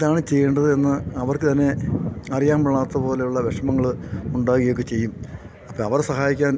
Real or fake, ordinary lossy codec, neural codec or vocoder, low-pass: real; none; none; none